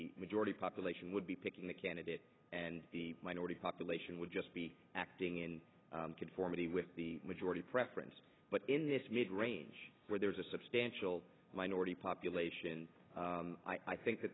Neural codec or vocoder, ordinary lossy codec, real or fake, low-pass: none; AAC, 16 kbps; real; 7.2 kHz